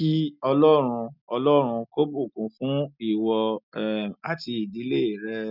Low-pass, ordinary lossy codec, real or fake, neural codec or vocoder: 5.4 kHz; none; real; none